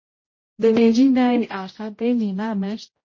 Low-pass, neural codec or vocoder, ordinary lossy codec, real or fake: 7.2 kHz; codec, 16 kHz, 0.5 kbps, X-Codec, HuBERT features, trained on general audio; MP3, 32 kbps; fake